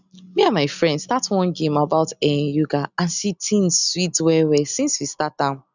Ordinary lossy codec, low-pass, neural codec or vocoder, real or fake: none; 7.2 kHz; none; real